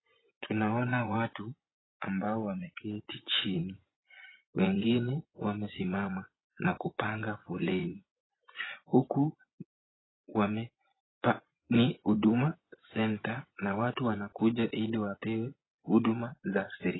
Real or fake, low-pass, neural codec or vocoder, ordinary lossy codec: fake; 7.2 kHz; vocoder, 24 kHz, 100 mel bands, Vocos; AAC, 16 kbps